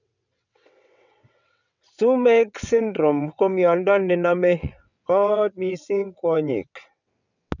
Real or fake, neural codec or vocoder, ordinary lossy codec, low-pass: fake; vocoder, 22.05 kHz, 80 mel bands, WaveNeXt; none; 7.2 kHz